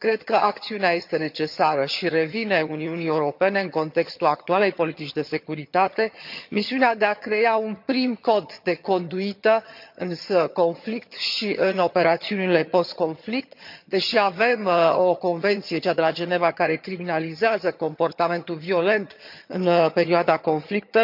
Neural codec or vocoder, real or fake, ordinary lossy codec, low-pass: vocoder, 22.05 kHz, 80 mel bands, HiFi-GAN; fake; MP3, 48 kbps; 5.4 kHz